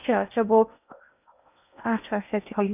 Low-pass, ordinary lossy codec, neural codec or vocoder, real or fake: 3.6 kHz; none; codec, 16 kHz in and 24 kHz out, 0.6 kbps, FocalCodec, streaming, 2048 codes; fake